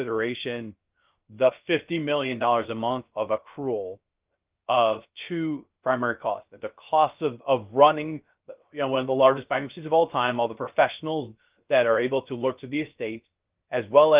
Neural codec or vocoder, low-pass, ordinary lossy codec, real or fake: codec, 16 kHz, 0.3 kbps, FocalCodec; 3.6 kHz; Opus, 32 kbps; fake